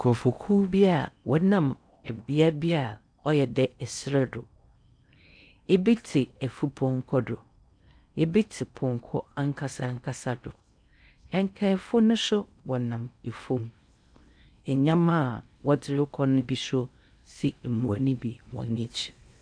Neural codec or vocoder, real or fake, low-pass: codec, 16 kHz in and 24 kHz out, 0.8 kbps, FocalCodec, streaming, 65536 codes; fake; 9.9 kHz